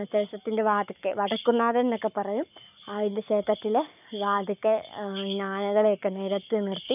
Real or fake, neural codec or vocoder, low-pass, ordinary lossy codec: fake; codec, 44.1 kHz, 7.8 kbps, Pupu-Codec; 3.6 kHz; none